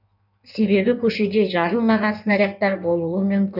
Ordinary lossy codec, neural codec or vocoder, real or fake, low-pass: none; codec, 16 kHz in and 24 kHz out, 1.1 kbps, FireRedTTS-2 codec; fake; 5.4 kHz